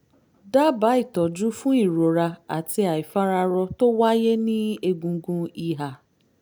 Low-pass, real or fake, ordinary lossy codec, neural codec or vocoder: none; real; none; none